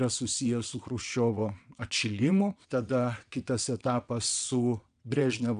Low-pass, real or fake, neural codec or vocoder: 9.9 kHz; fake; vocoder, 22.05 kHz, 80 mel bands, WaveNeXt